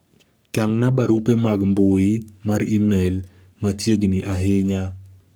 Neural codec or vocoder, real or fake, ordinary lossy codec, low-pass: codec, 44.1 kHz, 3.4 kbps, Pupu-Codec; fake; none; none